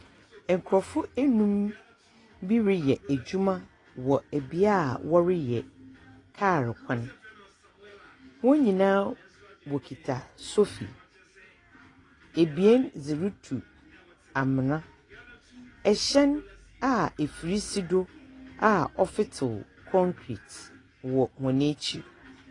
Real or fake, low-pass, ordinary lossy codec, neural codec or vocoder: real; 10.8 kHz; AAC, 32 kbps; none